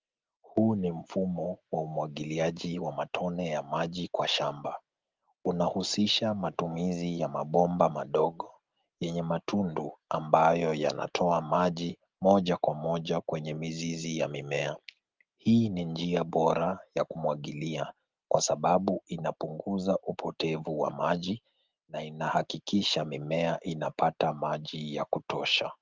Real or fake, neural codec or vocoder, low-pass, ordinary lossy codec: real; none; 7.2 kHz; Opus, 32 kbps